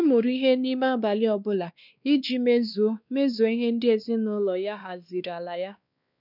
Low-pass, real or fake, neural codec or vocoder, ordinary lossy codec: 5.4 kHz; fake; codec, 16 kHz, 2 kbps, X-Codec, WavLM features, trained on Multilingual LibriSpeech; none